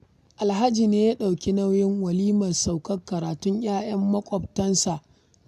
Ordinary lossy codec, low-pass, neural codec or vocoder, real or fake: none; 14.4 kHz; none; real